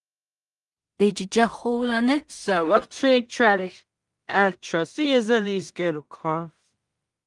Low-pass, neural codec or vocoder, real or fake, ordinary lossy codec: 10.8 kHz; codec, 16 kHz in and 24 kHz out, 0.4 kbps, LongCat-Audio-Codec, two codebook decoder; fake; Opus, 32 kbps